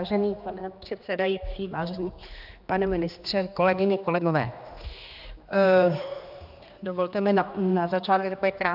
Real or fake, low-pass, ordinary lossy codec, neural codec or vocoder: fake; 5.4 kHz; AAC, 48 kbps; codec, 16 kHz, 2 kbps, X-Codec, HuBERT features, trained on general audio